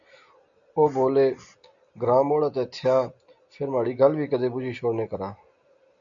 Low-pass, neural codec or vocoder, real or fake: 7.2 kHz; none; real